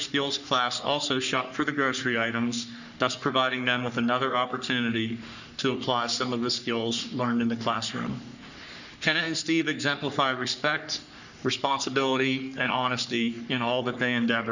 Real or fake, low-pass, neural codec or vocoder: fake; 7.2 kHz; codec, 44.1 kHz, 3.4 kbps, Pupu-Codec